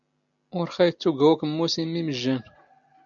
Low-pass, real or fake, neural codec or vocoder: 7.2 kHz; real; none